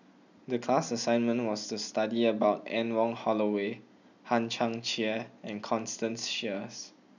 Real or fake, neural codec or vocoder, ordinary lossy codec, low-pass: real; none; none; 7.2 kHz